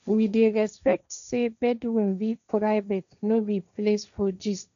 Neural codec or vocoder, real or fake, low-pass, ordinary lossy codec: codec, 16 kHz, 1.1 kbps, Voila-Tokenizer; fake; 7.2 kHz; none